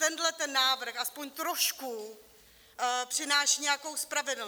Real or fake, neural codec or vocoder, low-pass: real; none; 19.8 kHz